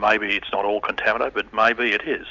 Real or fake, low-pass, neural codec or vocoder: real; 7.2 kHz; none